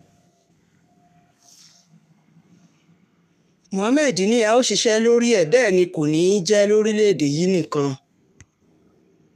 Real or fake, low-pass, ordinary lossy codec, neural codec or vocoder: fake; 14.4 kHz; none; codec, 32 kHz, 1.9 kbps, SNAC